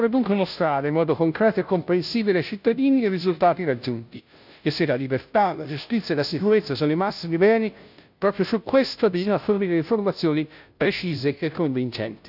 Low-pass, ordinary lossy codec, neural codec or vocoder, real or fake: 5.4 kHz; none; codec, 16 kHz, 0.5 kbps, FunCodec, trained on Chinese and English, 25 frames a second; fake